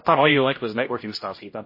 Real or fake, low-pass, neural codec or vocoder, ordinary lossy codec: fake; 5.4 kHz; codec, 16 kHz, 0.5 kbps, X-Codec, HuBERT features, trained on general audio; MP3, 24 kbps